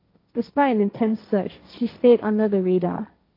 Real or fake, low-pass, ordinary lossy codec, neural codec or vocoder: fake; 5.4 kHz; AAC, 32 kbps; codec, 16 kHz, 1.1 kbps, Voila-Tokenizer